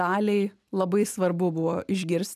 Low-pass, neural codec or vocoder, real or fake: 14.4 kHz; none; real